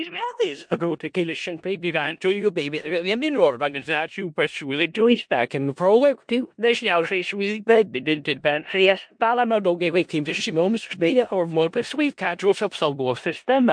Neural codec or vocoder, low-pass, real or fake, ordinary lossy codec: codec, 16 kHz in and 24 kHz out, 0.4 kbps, LongCat-Audio-Codec, four codebook decoder; 9.9 kHz; fake; MP3, 64 kbps